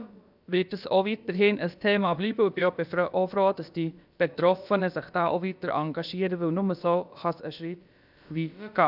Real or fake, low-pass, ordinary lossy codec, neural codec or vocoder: fake; 5.4 kHz; none; codec, 16 kHz, about 1 kbps, DyCAST, with the encoder's durations